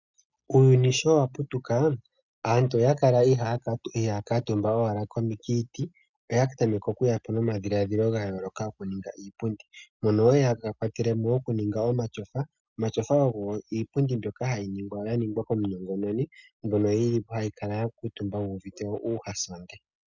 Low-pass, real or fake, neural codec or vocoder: 7.2 kHz; real; none